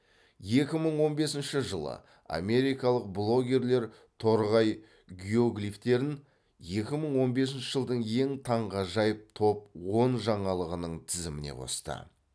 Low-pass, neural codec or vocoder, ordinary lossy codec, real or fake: none; none; none; real